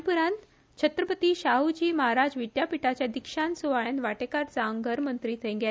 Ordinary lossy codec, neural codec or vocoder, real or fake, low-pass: none; none; real; none